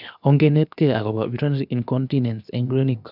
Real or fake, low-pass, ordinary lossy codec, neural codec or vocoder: fake; 5.4 kHz; none; codec, 16 kHz, 0.7 kbps, FocalCodec